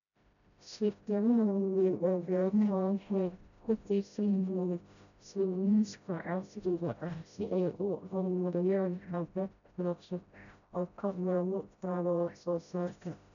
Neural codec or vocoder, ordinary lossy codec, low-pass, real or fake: codec, 16 kHz, 0.5 kbps, FreqCodec, smaller model; none; 7.2 kHz; fake